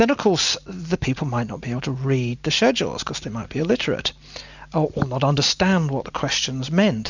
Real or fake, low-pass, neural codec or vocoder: real; 7.2 kHz; none